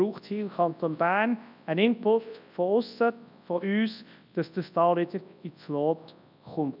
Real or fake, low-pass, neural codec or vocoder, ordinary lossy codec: fake; 5.4 kHz; codec, 24 kHz, 0.9 kbps, WavTokenizer, large speech release; none